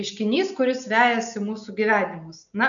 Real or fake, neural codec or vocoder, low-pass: real; none; 7.2 kHz